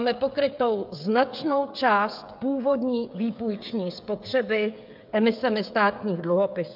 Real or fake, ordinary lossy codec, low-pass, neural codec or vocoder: fake; MP3, 48 kbps; 5.4 kHz; codec, 16 kHz, 16 kbps, FreqCodec, smaller model